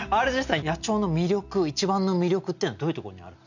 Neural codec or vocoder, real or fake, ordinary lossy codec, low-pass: none; real; none; 7.2 kHz